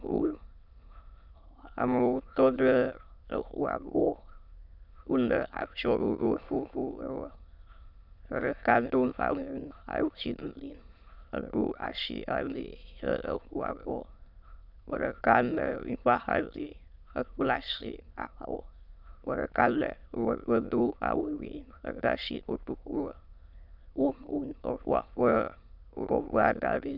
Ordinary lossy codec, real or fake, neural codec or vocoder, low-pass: Opus, 64 kbps; fake; autoencoder, 22.05 kHz, a latent of 192 numbers a frame, VITS, trained on many speakers; 5.4 kHz